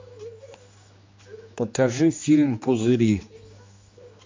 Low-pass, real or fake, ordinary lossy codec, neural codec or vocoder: 7.2 kHz; fake; MP3, 48 kbps; codec, 16 kHz, 2 kbps, X-Codec, HuBERT features, trained on general audio